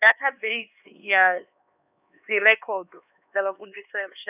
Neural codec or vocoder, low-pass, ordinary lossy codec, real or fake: codec, 16 kHz, 2 kbps, X-Codec, HuBERT features, trained on LibriSpeech; 3.6 kHz; none; fake